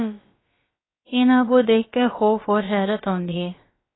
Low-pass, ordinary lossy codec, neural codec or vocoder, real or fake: 7.2 kHz; AAC, 16 kbps; codec, 16 kHz, about 1 kbps, DyCAST, with the encoder's durations; fake